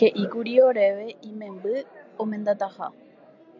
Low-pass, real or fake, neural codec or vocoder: 7.2 kHz; real; none